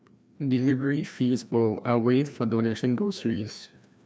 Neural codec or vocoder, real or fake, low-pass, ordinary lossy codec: codec, 16 kHz, 1 kbps, FreqCodec, larger model; fake; none; none